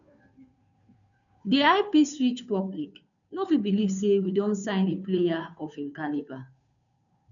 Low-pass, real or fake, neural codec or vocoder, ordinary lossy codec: 7.2 kHz; fake; codec, 16 kHz, 2 kbps, FunCodec, trained on Chinese and English, 25 frames a second; none